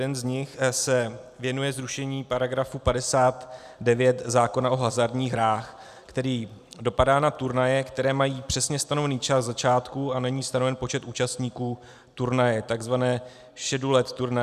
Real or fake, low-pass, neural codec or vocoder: real; 14.4 kHz; none